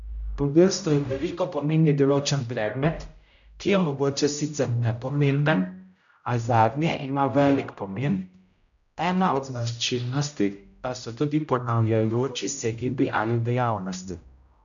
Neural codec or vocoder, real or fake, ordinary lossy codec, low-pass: codec, 16 kHz, 0.5 kbps, X-Codec, HuBERT features, trained on general audio; fake; none; 7.2 kHz